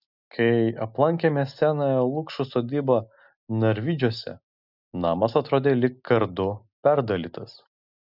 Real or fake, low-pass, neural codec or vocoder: real; 5.4 kHz; none